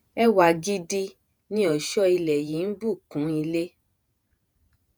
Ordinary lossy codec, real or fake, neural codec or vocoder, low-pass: none; fake; vocoder, 48 kHz, 128 mel bands, Vocos; none